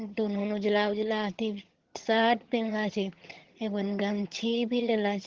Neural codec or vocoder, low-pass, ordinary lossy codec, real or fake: vocoder, 22.05 kHz, 80 mel bands, HiFi-GAN; 7.2 kHz; Opus, 16 kbps; fake